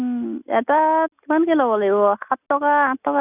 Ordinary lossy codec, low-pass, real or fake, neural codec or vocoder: none; 3.6 kHz; real; none